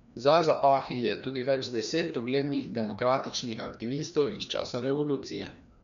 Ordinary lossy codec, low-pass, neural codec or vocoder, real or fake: none; 7.2 kHz; codec, 16 kHz, 1 kbps, FreqCodec, larger model; fake